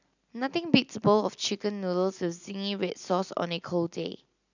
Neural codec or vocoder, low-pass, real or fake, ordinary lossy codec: none; 7.2 kHz; real; none